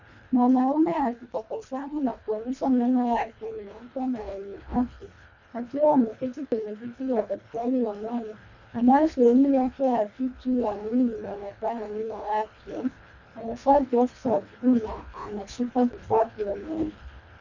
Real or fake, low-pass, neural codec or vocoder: fake; 7.2 kHz; codec, 24 kHz, 1.5 kbps, HILCodec